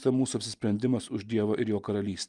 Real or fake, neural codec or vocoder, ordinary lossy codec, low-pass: real; none; Opus, 32 kbps; 10.8 kHz